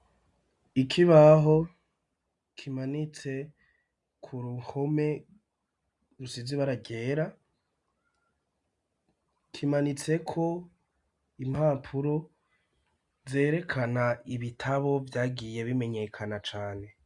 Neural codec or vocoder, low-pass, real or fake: none; 10.8 kHz; real